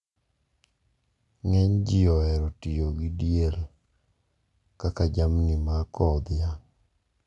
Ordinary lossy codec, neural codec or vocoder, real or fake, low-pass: none; none; real; 10.8 kHz